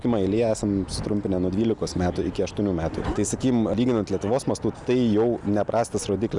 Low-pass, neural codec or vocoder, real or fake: 10.8 kHz; none; real